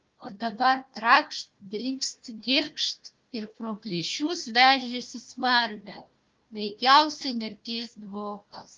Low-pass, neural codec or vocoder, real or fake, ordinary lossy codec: 7.2 kHz; codec, 16 kHz, 1 kbps, FunCodec, trained on Chinese and English, 50 frames a second; fake; Opus, 32 kbps